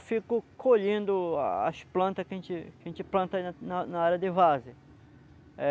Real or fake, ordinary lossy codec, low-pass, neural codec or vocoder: real; none; none; none